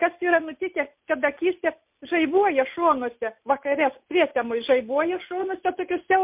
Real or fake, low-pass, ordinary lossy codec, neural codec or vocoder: real; 3.6 kHz; MP3, 32 kbps; none